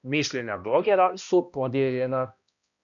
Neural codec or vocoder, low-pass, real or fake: codec, 16 kHz, 1 kbps, X-Codec, HuBERT features, trained on balanced general audio; 7.2 kHz; fake